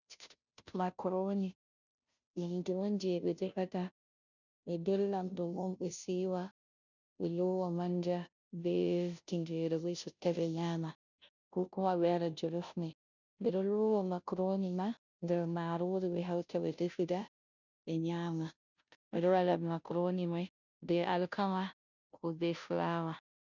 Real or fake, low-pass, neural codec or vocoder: fake; 7.2 kHz; codec, 16 kHz, 0.5 kbps, FunCodec, trained on Chinese and English, 25 frames a second